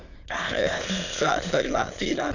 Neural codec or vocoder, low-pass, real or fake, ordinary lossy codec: autoencoder, 22.05 kHz, a latent of 192 numbers a frame, VITS, trained on many speakers; 7.2 kHz; fake; none